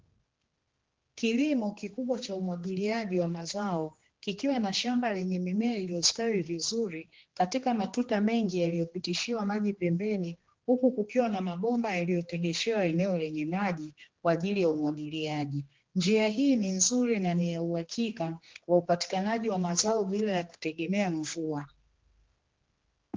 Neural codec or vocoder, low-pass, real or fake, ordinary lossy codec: codec, 16 kHz, 2 kbps, X-Codec, HuBERT features, trained on general audio; 7.2 kHz; fake; Opus, 16 kbps